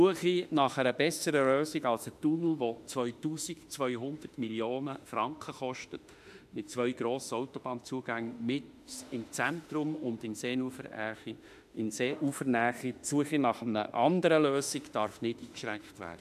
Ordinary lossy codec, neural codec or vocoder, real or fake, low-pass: AAC, 96 kbps; autoencoder, 48 kHz, 32 numbers a frame, DAC-VAE, trained on Japanese speech; fake; 14.4 kHz